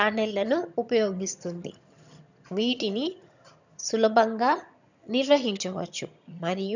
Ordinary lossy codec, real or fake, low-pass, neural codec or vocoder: none; fake; 7.2 kHz; vocoder, 22.05 kHz, 80 mel bands, HiFi-GAN